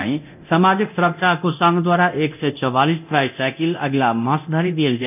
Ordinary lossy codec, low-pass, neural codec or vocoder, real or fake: none; 3.6 kHz; codec, 24 kHz, 0.9 kbps, DualCodec; fake